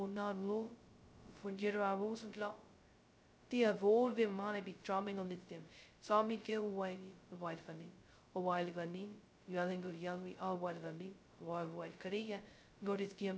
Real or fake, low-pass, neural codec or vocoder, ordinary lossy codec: fake; none; codec, 16 kHz, 0.2 kbps, FocalCodec; none